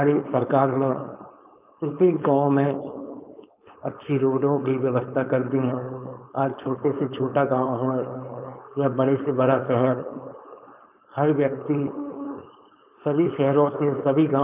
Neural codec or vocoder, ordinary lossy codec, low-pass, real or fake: codec, 16 kHz, 4.8 kbps, FACodec; none; 3.6 kHz; fake